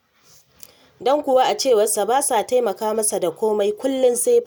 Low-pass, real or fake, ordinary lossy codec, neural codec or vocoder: none; real; none; none